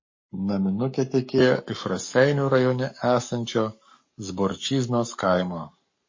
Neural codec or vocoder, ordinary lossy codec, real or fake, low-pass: codec, 44.1 kHz, 7.8 kbps, Pupu-Codec; MP3, 32 kbps; fake; 7.2 kHz